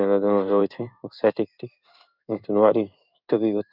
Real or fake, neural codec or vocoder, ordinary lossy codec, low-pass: fake; codec, 16 kHz in and 24 kHz out, 1 kbps, XY-Tokenizer; none; 5.4 kHz